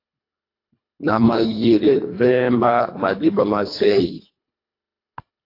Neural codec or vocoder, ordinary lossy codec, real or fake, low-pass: codec, 24 kHz, 1.5 kbps, HILCodec; AAC, 32 kbps; fake; 5.4 kHz